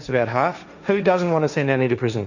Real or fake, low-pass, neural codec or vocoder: fake; 7.2 kHz; codec, 16 kHz, 1.1 kbps, Voila-Tokenizer